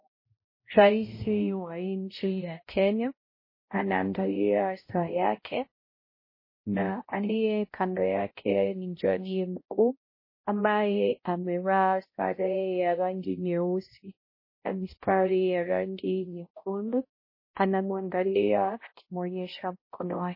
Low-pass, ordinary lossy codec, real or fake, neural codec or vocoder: 5.4 kHz; MP3, 24 kbps; fake; codec, 16 kHz, 0.5 kbps, X-Codec, HuBERT features, trained on balanced general audio